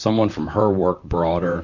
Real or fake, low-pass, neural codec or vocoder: fake; 7.2 kHz; vocoder, 44.1 kHz, 128 mel bands, Pupu-Vocoder